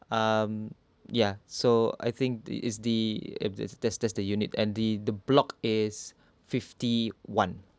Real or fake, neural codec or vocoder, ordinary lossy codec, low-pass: real; none; none; none